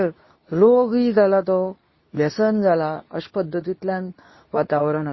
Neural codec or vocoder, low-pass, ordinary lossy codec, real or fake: codec, 24 kHz, 0.9 kbps, WavTokenizer, medium speech release version 2; 7.2 kHz; MP3, 24 kbps; fake